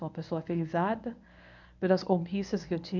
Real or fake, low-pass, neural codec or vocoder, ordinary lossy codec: fake; 7.2 kHz; codec, 24 kHz, 0.9 kbps, WavTokenizer, medium speech release version 1; none